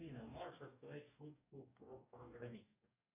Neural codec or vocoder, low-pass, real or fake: codec, 44.1 kHz, 2.6 kbps, DAC; 3.6 kHz; fake